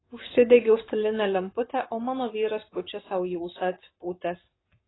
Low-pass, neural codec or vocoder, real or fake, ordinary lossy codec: 7.2 kHz; codec, 44.1 kHz, 7.8 kbps, Pupu-Codec; fake; AAC, 16 kbps